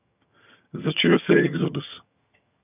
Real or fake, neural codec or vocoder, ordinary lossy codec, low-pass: fake; vocoder, 22.05 kHz, 80 mel bands, HiFi-GAN; none; 3.6 kHz